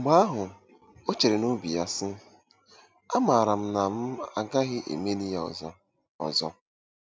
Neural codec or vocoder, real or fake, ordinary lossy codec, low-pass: none; real; none; none